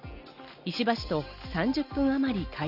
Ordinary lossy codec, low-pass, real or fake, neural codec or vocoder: none; 5.4 kHz; real; none